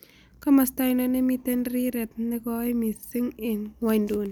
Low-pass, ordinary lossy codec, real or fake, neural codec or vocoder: none; none; real; none